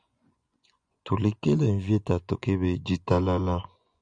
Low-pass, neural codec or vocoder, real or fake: 9.9 kHz; vocoder, 24 kHz, 100 mel bands, Vocos; fake